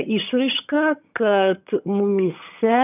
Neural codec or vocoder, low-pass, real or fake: vocoder, 22.05 kHz, 80 mel bands, HiFi-GAN; 3.6 kHz; fake